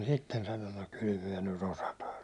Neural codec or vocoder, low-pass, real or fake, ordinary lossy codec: none; 10.8 kHz; real; none